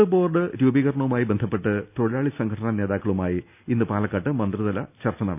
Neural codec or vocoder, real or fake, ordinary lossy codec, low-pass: none; real; none; 3.6 kHz